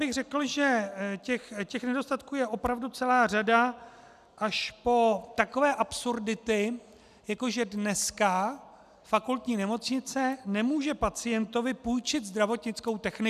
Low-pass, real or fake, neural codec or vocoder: 14.4 kHz; real; none